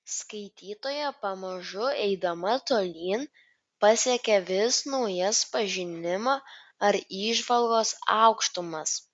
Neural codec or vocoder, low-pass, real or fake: none; 9.9 kHz; real